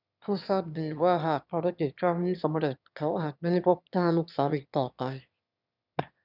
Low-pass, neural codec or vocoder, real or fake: 5.4 kHz; autoencoder, 22.05 kHz, a latent of 192 numbers a frame, VITS, trained on one speaker; fake